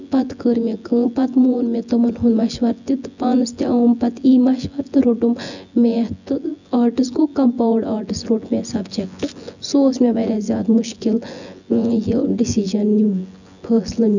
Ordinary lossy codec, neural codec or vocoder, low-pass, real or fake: none; vocoder, 24 kHz, 100 mel bands, Vocos; 7.2 kHz; fake